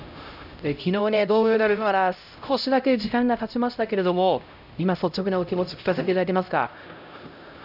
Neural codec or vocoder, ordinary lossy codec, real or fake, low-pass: codec, 16 kHz, 0.5 kbps, X-Codec, HuBERT features, trained on LibriSpeech; none; fake; 5.4 kHz